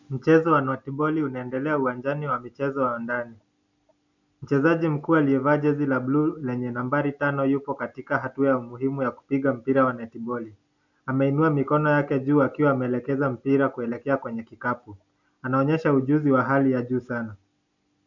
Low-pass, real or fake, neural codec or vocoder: 7.2 kHz; real; none